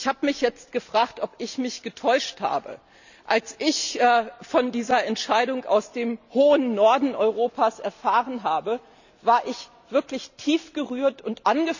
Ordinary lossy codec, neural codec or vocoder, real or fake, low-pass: none; none; real; 7.2 kHz